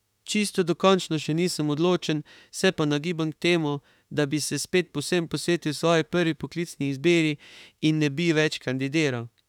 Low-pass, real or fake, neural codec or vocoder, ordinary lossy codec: 19.8 kHz; fake; autoencoder, 48 kHz, 32 numbers a frame, DAC-VAE, trained on Japanese speech; none